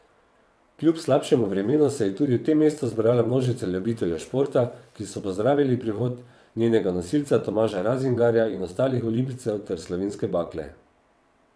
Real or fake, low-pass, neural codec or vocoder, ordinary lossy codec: fake; none; vocoder, 22.05 kHz, 80 mel bands, WaveNeXt; none